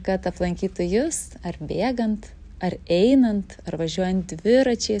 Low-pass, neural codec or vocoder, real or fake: 9.9 kHz; none; real